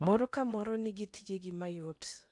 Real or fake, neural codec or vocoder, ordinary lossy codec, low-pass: fake; codec, 16 kHz in and 24 kHz out, 0.8 kbps, FocalCodec, streaming, 65536 codes; none; 10.8 kHz